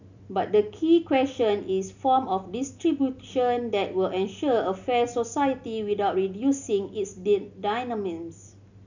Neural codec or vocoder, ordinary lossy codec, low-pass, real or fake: none; none; 7.2 kHz; real